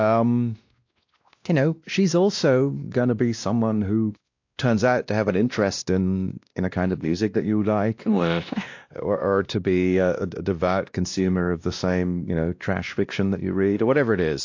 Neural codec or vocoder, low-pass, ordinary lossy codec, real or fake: codec, 16 kHz, 1 kbps, X-Codec, WavLM features, trained on Multilingual LibriSpeech; 7.2 kHz; AAC, 48 kbps; fake